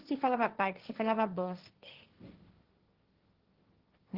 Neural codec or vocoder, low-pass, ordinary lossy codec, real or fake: codec, 16 kHz, 1.1 kbps, Voila-Tokenizer; 5.4 kHz; Opus, 16 kbps; fake